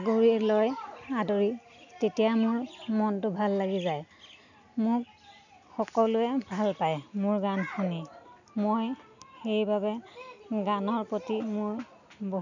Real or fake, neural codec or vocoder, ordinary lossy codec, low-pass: real; none; none; 7.2 kHz